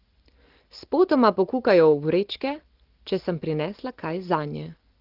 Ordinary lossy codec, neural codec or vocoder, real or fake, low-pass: Opus, 16 kbps; none; real; 5.4 kHz